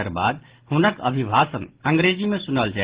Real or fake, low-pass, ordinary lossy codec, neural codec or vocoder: real; 3.6 kHz; Opus, 16 kbps; none